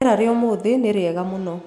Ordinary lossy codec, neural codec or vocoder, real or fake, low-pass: none; none; real; 14.4 kHz